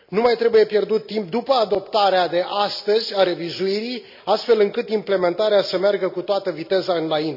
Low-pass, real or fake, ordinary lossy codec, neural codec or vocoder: 5.4 kHz; real; none; none